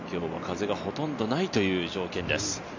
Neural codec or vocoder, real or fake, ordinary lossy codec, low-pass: none; real; MP3, 48 kbps; 7.2 kHz